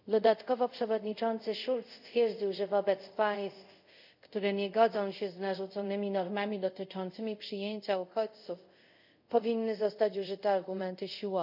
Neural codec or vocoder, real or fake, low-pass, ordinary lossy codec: codec, 24 kHz, 0.5 kbps, DualCodec; fake; 5.4 kHz; none